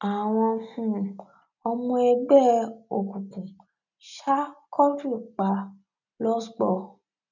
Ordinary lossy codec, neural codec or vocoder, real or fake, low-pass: none; none; real; 7.2 kHz